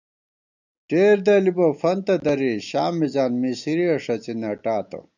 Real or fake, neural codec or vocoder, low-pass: real; none; 7.2 kHz